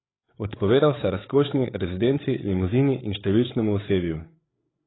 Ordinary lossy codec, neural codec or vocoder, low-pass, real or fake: AAC, 16 kbps; codec, 16 kHz, 8 kbps, FreqCodec, larger model; 7.2 kHz; fake